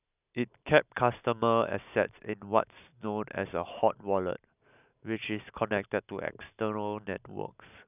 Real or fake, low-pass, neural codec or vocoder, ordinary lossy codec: real; 3.6 kHz; none; none